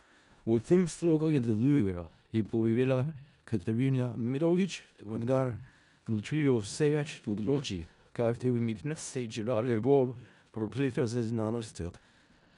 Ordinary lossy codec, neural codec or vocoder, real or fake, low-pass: none; codec, 16 kHz in and 24 kHz out, 0.4 kbps, LongCat-Audio-Codec, four codebook decoder; fake; 10.8 kHz